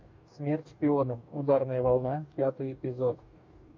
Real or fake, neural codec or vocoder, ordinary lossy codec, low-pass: fake; codec, 44.1 kHz, 2.6 kbps, DAC; MP3, 64 kbps; 7.2 kHz